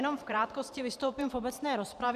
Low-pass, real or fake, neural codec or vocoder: 14.4 kHz; fake; vocoder, 44.1 kHz, 128 mel bands every 512 samples, BigVGAN v2